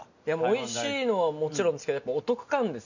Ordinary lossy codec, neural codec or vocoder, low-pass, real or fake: none; none; 7.2 kHz; real